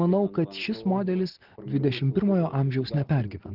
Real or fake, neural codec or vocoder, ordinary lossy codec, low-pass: real; none; Opus, 16 kbps; 5.4 kHz